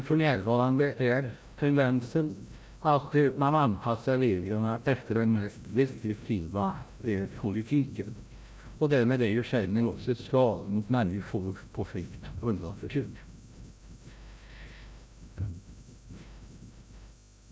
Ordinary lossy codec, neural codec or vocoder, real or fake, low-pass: none; codec, 16 kHz, 0.5 kbps, FreqCodec, larger model; fake; none